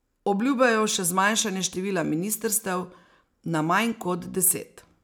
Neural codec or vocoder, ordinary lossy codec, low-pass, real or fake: none; none; none; real